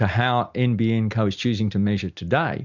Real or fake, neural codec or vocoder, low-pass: real; none; 7.2 kHz